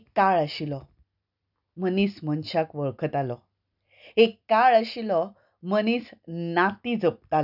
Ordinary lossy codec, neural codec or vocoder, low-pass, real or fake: none; none; 5.4 kHz; real